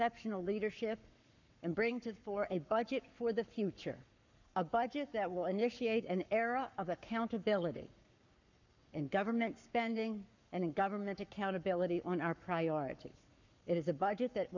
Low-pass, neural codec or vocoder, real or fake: 7.2 kHz; codec, 44.1 kHz, 7.8 kbps, Pupu-Codec; fake